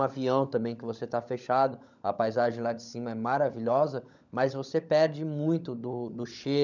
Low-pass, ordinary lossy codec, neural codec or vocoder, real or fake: 7.2 kHz; none; codec, 16 kHz, 16 kbps, FunCodec, trained on LibriTTS, 50 frames a second; fake